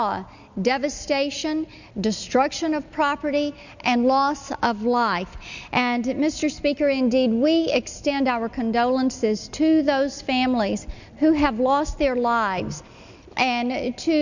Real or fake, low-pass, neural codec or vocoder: real; 7.2 kHz; none